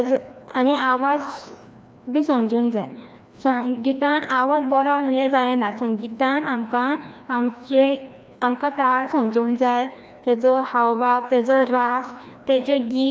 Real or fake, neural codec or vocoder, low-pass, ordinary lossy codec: fake; codec, 16 kHz, 1 kbps, FreqCodec, larger model; none; none